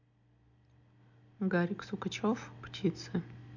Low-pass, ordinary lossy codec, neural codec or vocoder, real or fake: 7.2 kHz; MP3, 48 kbps; none; real